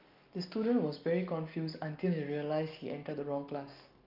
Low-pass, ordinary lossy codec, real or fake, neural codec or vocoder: 5.4 kHz; Opus, 24 kbps; real; none